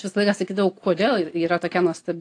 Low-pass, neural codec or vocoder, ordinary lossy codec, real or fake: 9.9 kHz; none; AAC, 48 kbps; real